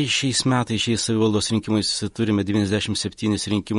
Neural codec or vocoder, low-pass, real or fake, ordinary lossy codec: vocoder, 48 kHz, 128 mel bands, Vocos; 19.8 kHz; fake; MP3, 48 kbps